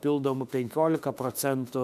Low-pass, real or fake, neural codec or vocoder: 14.4 kHz; fake; autoencoder, 48 kHz, 32 numbers a frame, DAC-VAE, trained on Japanese speech